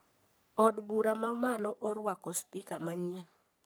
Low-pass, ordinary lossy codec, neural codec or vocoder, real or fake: none; none; codec, 44.1 kHz, 3.4 kbps, Pupu-Codec; fake